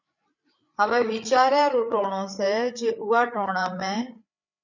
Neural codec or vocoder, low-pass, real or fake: codec, 16 kHz, 8 kbps, FreqCodec, larger model; 7.2 kHz; fake